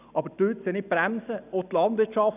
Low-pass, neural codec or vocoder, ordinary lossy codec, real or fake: 3.6 kHz; none; none; real